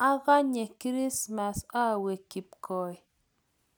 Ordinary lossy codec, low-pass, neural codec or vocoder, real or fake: none; none; none; real